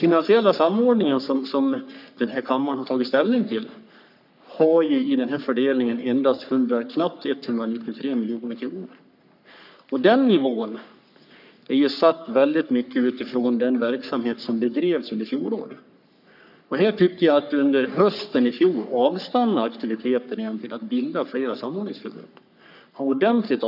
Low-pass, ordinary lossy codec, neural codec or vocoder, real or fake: 5.4 kHz; none; codec, 44.1 kHz, 3.4 kbps, Pupu-Codec; fake